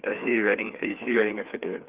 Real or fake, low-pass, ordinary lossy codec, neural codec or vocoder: fake; 3.6 kHz; Opus, 24 kbps; codec, 16 kHz, 4 kbps, FreqCodec, larger model